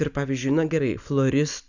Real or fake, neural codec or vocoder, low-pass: real; none; 7.2 kHz